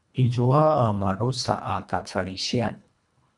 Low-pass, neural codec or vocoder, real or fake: 10.8 kHz; codec, 24 kHz, 1.5 kbps, HILCodec; fake